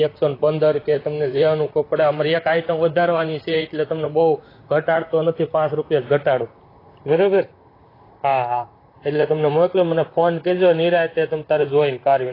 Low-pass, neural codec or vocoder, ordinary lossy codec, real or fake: 5.4 kHz; vocoder, 44.1 kHz, 128 mel bands, Pupu-Vocoder; AAC, 24 kbps; fake